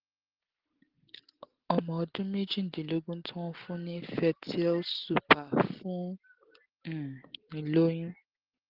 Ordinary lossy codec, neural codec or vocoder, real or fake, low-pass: Opus, 16 kbps; none; real; 5.4 kHz